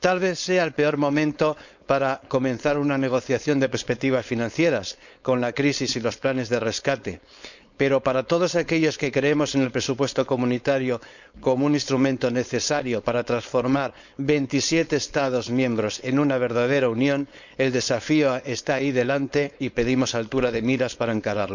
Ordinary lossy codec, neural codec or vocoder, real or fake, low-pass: none; codec, 16 kHz, 4.8 kbps, FACodec; fake; 7.2 kHz